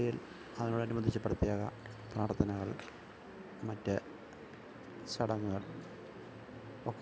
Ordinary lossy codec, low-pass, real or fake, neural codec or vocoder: none; none; real; none